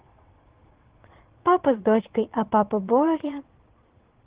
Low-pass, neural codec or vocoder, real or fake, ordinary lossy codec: 3.6 kHz; vocoder, 22.05 kHz, 80 mel bands, Vocos; fake; Opus, 16 kbps